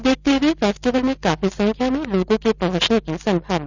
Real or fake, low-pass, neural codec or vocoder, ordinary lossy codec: real; 7.2 kHz; none; none